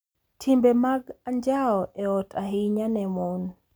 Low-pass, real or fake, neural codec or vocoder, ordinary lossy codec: none; real; none; none